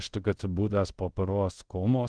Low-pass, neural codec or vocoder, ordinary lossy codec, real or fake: 9.9 kHz; codec, 24 kHz, 0.5 kbps, DualCodec; Opus, 16 kbps; fake